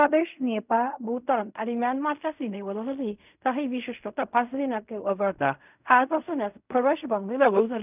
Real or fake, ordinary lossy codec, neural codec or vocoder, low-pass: fake; none; codec, 16 kHz in and 24 kHz out, 0.4 kbps, LongCat-Audio-Codec, fine tuned four codebook decoder; 3.6 kHz